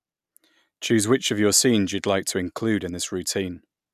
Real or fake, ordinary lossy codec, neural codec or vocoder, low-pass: real; none; none; 14.4 kHz